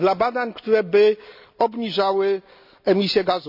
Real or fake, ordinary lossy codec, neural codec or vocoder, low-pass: real; none; none; 5.4 kHz